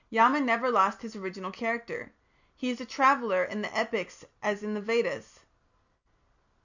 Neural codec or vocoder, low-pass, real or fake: none; 7.2 kHz; real